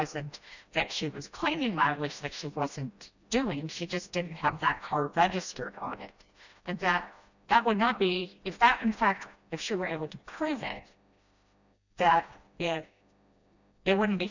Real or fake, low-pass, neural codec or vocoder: fake; 7.2 kHz; codec, 16 kHz, 1 kbps, FreqCodec, smaller model